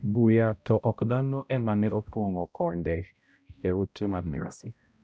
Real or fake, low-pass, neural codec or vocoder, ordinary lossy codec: fake; none; codec, 16 kHz, 0.5 kbps, X-Codec, HuBERT features, trained on balanced general audio; none